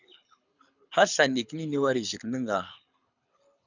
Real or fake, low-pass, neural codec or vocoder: fake; 7.2 kHz; codec, 24 kHz, 6 kbps, HILCodec